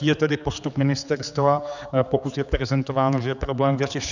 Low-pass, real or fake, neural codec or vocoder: 7.2 kHz; fake; codec, 16 kHz, 4 kbps, X-Codec, HuBERT features, trained on general audio